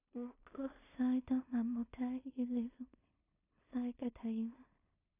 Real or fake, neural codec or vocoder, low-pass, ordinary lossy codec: fake; codec, 16 kHz in and 24 kHz out, 0.4 kbps, LongCat-Audio-Codec, two codebook decoder; 3.6 kHz; none